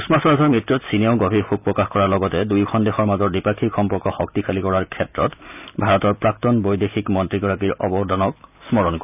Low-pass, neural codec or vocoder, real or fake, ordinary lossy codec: 3.6 kHz; none; real; none